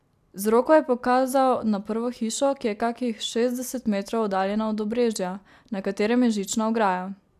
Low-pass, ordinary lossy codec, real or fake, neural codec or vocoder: 14.4 kHz; AAC, 96 kbps; real; none